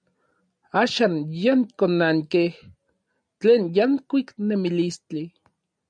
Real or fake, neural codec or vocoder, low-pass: real; none; 9.9 kHz